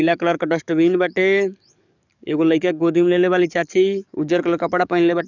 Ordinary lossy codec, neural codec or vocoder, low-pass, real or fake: none; codec, 44.1 kHz, 7.8 kbps, DAC; 7.2 kHz; fake